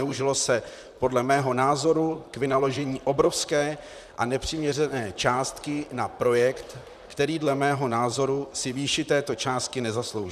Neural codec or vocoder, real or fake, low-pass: vocoder, 44.1 kHz, 128 mel bands, Pupu-Vocoder; fake; 14.4 kHz